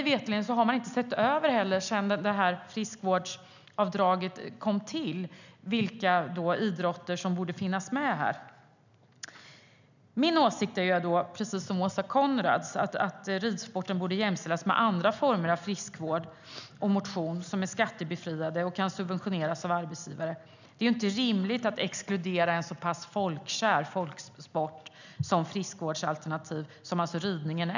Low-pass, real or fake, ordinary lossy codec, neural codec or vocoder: 7.2 kHz; real; none; none